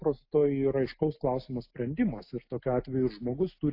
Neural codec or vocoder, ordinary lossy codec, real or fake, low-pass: none; AAC, 32 kbps; real; 5.4 kHz